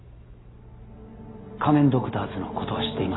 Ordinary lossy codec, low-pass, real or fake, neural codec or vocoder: AAC, 16 kbps; 7.2 kHz; real; none